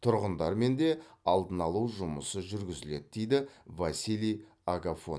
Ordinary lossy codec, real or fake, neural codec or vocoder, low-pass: none; real; none; none